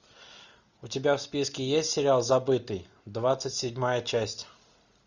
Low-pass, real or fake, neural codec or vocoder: 7.2 kHz; real; none